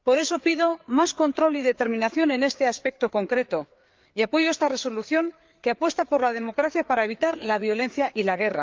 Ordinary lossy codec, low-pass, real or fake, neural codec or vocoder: Opus, 32 kbps; 7.2 kHz; fake; codec, 16 kHz, 4 kbps, FreqCodec, larger model